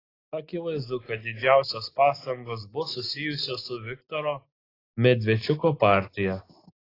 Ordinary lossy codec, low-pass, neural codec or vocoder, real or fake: AAC, 24 kbps; 5.4 kHz; none; real